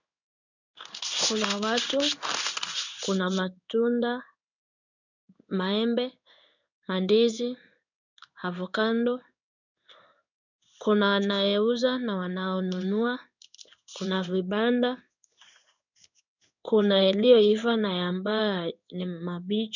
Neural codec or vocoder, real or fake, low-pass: codec, 16 kHz in and 24 kHz out, 1 kbps, XY-Tokenizer; fake; 7.2 kHz